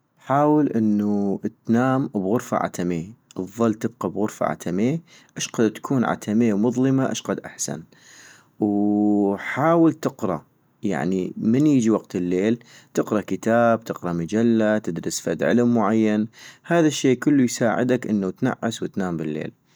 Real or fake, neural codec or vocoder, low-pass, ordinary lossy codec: real; none; none; none